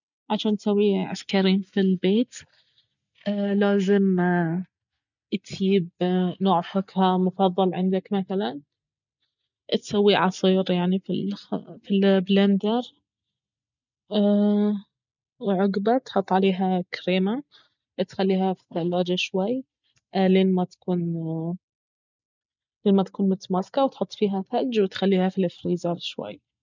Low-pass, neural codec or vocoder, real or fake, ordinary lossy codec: 7.2 kHz; none; real; none